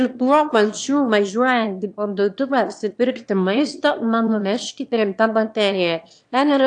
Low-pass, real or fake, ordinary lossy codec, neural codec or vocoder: 9.9 kHz; fake; AAC, 64 kbps; autoencoder, 22.05 kHz, a latent of 192 numbers a frame, VITS, trained on one speaker